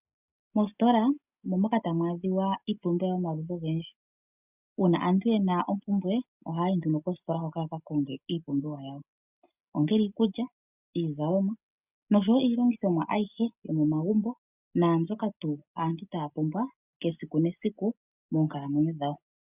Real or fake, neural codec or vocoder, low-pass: real; none; 3.6 kHz